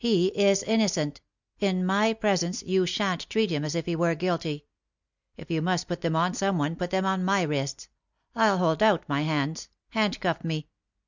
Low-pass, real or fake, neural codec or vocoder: 7.2 kHz; real; none